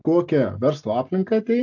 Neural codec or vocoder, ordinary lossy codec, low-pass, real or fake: none; AAC, 48 kbps; 7.2 kHz; real